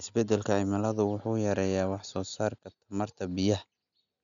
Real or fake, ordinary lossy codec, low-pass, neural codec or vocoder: real; none; 7.2 kHz; none